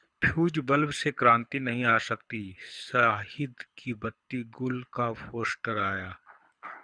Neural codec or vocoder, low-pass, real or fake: codec, 24 kHz, 6 kbps, HILCodec; 9.9 kHz; fake